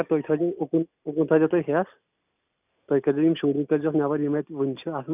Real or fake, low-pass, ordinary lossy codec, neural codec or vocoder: real; 3.6 kHz; none; none